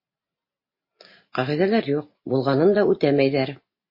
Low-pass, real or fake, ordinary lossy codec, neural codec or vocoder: 5.4 kHz; real; MP3, 24 kbps; none